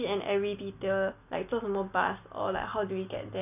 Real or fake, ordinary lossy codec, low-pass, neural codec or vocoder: real; none; 3.6 kHz; none